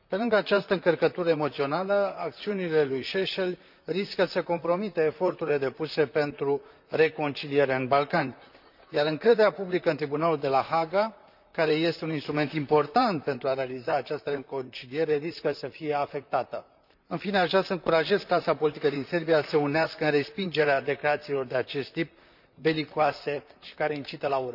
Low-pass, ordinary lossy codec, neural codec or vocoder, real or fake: 5.4 kHz; none; vocoder, 44.1 kHz, 128 mel bands, Pupu-Vocoder; fake